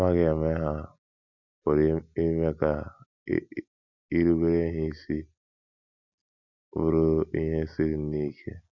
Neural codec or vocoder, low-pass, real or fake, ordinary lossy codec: none; 7.2 kHz; real; none